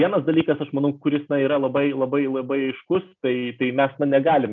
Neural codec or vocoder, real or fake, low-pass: none; real; 7.2 kHz